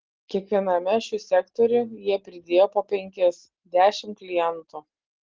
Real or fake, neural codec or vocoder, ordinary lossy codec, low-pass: real; none; Opus, 16 kbps; 7.2 kHz